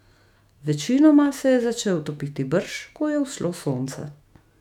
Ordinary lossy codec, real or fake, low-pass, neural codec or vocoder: none; fake; 19.8 kHz; autoencoder, 48 kHz, 128 numbers a frame, DAC-VAE, trained on Japanese speech